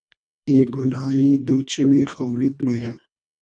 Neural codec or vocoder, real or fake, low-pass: codec, 24 kHz, 1.5 kbps, HILCodec; fake; 9.9 kHz